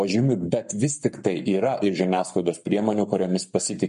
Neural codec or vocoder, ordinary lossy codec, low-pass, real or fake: codec, 44.1 kHz, 7.8 kbps, Pupu-Codec; MP3, 48 kbps; 14.4 kHz; fake